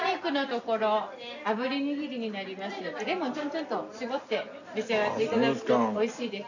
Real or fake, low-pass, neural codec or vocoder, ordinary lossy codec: real; 7.2 kHz; none; none